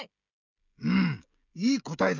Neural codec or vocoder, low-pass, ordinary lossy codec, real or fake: codec, 16 kHz, 16 kbps, FreqCodec, smaller model; 7.2 kHz; none; fake